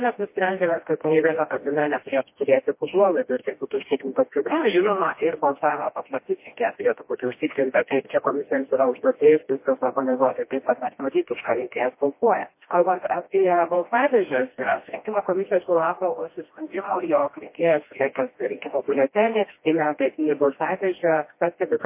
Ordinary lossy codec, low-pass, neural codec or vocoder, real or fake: MP3, 24 kbps; 3.6 kHz; codec, 16 kHz, 1 kbps, FreqCodec, smaller model; fake